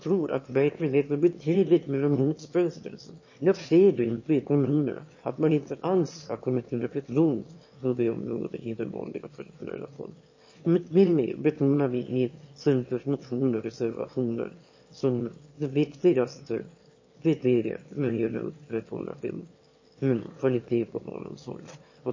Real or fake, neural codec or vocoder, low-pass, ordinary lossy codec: fake; autoencoder, 22.05 kHz, a latent of 192 numbers a frame, VITS, trained on one speaker; 7.2 kHz; MP3, 32 kbps